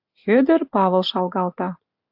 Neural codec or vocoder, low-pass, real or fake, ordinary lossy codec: none; 5.4 kHz; real; AAC, 48 kbps